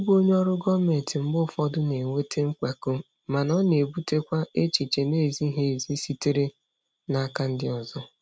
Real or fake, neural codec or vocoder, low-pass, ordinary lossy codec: real; none; none; none